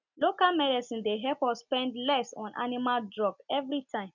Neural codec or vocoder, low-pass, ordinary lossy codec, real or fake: none; 7.2 kHz; none; real